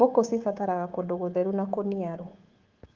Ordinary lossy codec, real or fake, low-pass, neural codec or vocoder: Opus, 16 kbps; fake; 7.2 kHz; codec, 24 kHz, 3.1 kbps, DualCodec